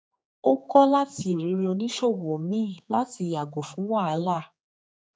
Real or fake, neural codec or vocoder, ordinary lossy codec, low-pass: fake; codec, 16 kHz, 4 kbps, X-Codec, HuBERT features, trained on general audio; none; none